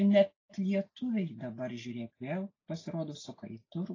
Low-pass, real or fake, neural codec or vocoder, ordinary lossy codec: 7.2 kHz; real; none; AAC, 32 kbps